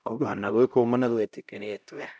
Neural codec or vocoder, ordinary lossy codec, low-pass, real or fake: codec, 16 kHz, 0.5 kbps, X-Codec, HuBERT features, trained on LibriSpeech; none; none; fake